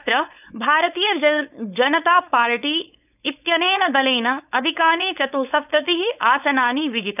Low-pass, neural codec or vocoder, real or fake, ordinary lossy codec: 3.6 kHz; codec, 16 kHz, 4.8 kbps, FACodec; fake; none